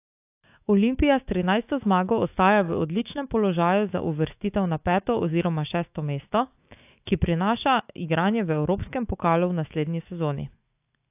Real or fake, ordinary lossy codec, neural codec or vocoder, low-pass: fake; AAC, 32 kbps; autoencoder, 48 kHz, 128 numbers a frame, DAC-VAE, trained on Japanese speech; 3.6 kHz